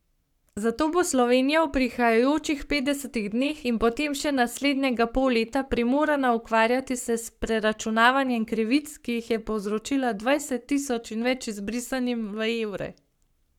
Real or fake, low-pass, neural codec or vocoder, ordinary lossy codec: fake; 19.8 kHz; codec, 44.1 kHz, 7.8 kbps, Pupu-Codec; none